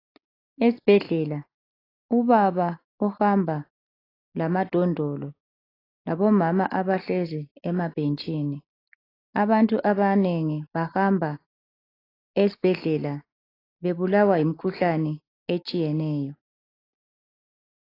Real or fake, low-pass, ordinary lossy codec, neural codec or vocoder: real; 5.4 kHz; AAC, 24 kbps; none